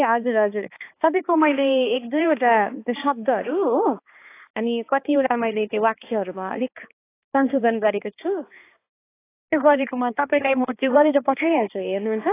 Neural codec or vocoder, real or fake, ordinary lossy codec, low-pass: codec, 16 kHz, 2 kbps, X-Codec, HuBERT features, trained on balanced general audio; fake; AAC, 24 kbps; 3.6 kHz